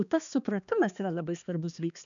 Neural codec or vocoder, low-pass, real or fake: codec, 16 kHz, 2 kbps, X-Codec, HuBERT features, trained on balanced general audio; 7.2 kHz; fake